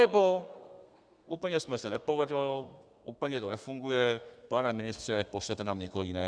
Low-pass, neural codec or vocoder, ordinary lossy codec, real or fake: 9.9 kHz; codec, 32 kHz, 1.9 kbps, SNAC; Opus, 64 kbps; fake